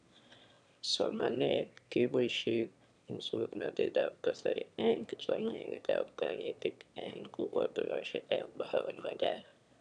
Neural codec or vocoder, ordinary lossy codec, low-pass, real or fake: autoencoder, 22.05 kHz, a latent of 192 numbers a frame, VITS, trained on one speaker; AAC, 96 kbps; 9.9 kHz; fake